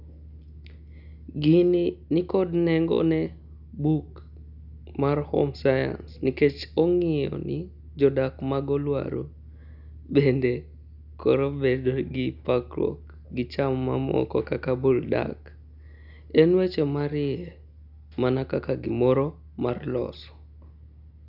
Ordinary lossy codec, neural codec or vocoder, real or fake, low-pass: none; none; real; 5.4 kHz